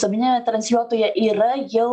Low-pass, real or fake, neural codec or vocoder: 10.8 kHz; real; none